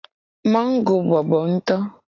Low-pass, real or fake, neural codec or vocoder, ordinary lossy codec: 7.2 kHz; real; none; AAC, 32 kbps